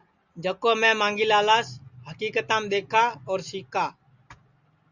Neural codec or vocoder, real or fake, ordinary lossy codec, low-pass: none; real; Opus, 64 kbps; 7.2 kHz